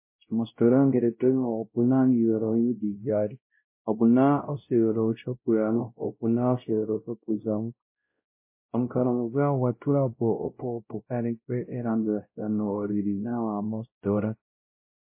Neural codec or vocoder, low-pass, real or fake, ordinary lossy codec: codec, 16 kHz, 0.5 kbps, X-Codec, WavLM features, trained on Multilingual LibriSpeech; 3.6 kHz; fake; MP3, 24 kbps